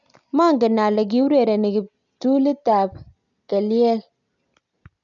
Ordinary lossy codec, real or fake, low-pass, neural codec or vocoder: none; real; 7.2 kHz; none